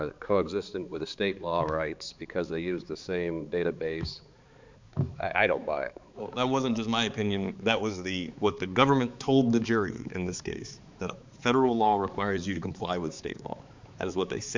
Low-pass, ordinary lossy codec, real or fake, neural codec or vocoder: 7.2 kHz; MP3, 64 kbps; fake; codec, 16 kHz, 4 kbps, X-Codec, HuBERT features, trained on balanced general audio